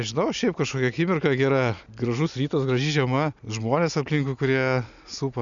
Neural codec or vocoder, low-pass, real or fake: none; 7.2 kHz; real